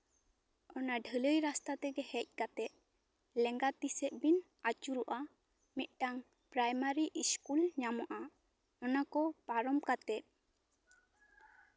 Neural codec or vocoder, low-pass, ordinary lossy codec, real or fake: none; none; none; real